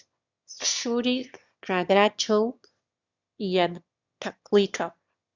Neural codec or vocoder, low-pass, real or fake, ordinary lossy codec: autoencoder, 22.05 kHz, a latent of 192 numbers a frame, VITS, trained on one speaker; 7.2 kHz; fake; Opus, 64 kbps